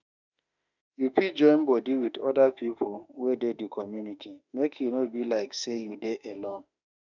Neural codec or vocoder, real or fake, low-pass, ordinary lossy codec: autoencoder, 48 kHz, 32 numbers a frame, DAC-VAE, trained on Japanese speech; fake; 7.2 kHz; none